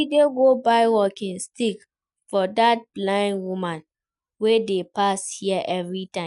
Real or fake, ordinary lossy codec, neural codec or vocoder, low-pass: real; none; none; 10.8 kHz